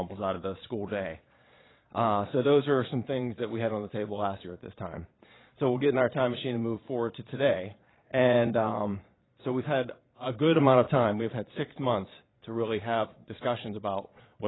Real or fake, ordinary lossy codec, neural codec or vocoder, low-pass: fake; AAC, 16 kbps; vocoder, 22.05 kHz, 80 mel bands, Vocos; 7.2 kHz